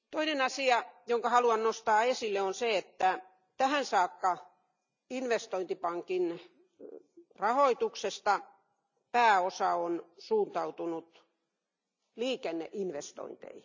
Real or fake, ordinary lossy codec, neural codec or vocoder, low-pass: real; none; none; 7.2 kHz